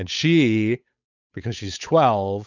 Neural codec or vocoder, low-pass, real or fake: codec, 16 kHz, 8 kbps, FunCodec, trained on Chinese and English, 25 frames a second; 7.2 kHz; fake